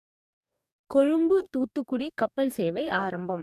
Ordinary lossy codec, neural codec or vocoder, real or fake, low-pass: none; codec, 44.1 kHz, 2.6 kbps, DAC; fake; 14.4 kHz